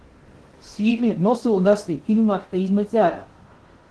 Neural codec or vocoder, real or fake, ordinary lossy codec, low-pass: codec, 16 kHz in and 24 kHz out, 0.6 kbps, FocalCodec, streaming, 2048 codes; fake; Opus, 16 kbps; 10.8 kHz